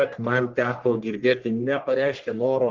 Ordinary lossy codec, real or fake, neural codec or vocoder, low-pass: Opus, 16 kbps; fake; codec, 44.1 kHz, 1.7 kbps, Pupu-Codec; 7.2 kHz